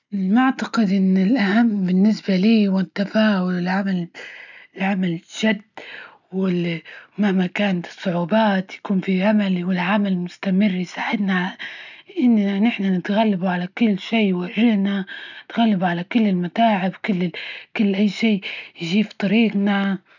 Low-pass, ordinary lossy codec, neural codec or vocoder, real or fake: 7.2 kHz; none; none; real